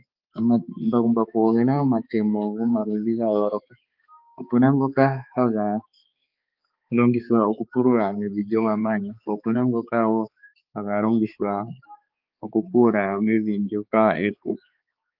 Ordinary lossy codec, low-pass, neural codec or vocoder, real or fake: Opus, 24 kbps; 5.4 kHz; codec, 16 kHz, 4 kbps, X-Codec, HuBERT features, trained on balanced general audio; fake